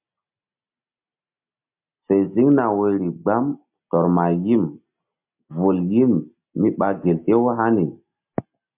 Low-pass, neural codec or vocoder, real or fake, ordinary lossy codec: 3.6 kHz; none; real; MP3, 32 kbps